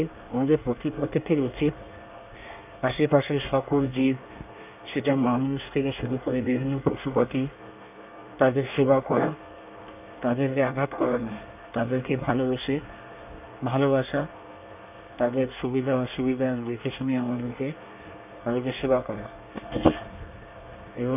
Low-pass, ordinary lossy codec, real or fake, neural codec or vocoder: 3.6 kHz; none; fake; codec, 24 kHz, 1 kbps, SNAC